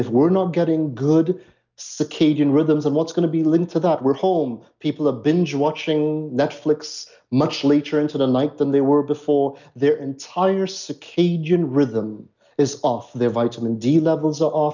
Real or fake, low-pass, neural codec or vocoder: real; 7.2 kHz; none